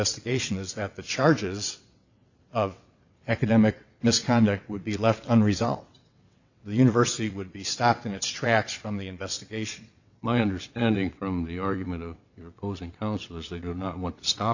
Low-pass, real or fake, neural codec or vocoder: 7.2 kHz; fake; vocoder, 22.05 kHz, 80 mel bands, WaveNeXt